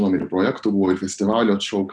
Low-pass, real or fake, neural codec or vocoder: 9.9 kHz; real; none